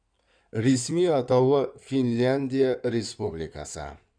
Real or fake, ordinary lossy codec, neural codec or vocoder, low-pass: fake; none; codec, 16 kHz in and 24 kHz out, 2.2 kbps, FireRedTTS-2 codec; 9.9 kHz